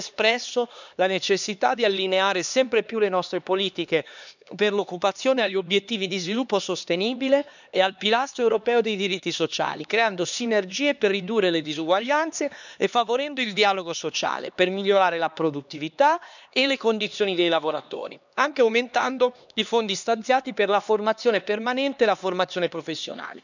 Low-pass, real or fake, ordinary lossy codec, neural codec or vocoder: 7.2 kHz; fake; none; codec, 16 kHz, 2 kbps, X-Codec, HuBERT features, trained on LibriSpeech